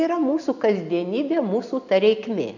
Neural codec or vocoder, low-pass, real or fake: none; 7.2 kHz; real